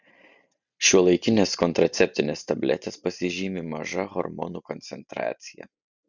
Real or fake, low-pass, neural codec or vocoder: real; 7.2 kHz; none